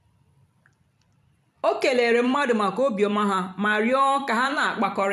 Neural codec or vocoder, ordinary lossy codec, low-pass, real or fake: vocoder, 44.1 kHz, 128 mel bands every 256 samples, BigVGAN v2; none; 14.4 kHz; fake